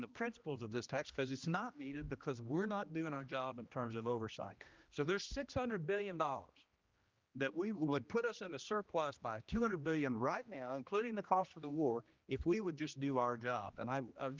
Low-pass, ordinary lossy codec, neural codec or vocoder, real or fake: 7.2 kHz; Opus, 24 kbps; codec, 16 kHz, 1 kbps, X-Codec, HuBERT features, trained on general audio; fake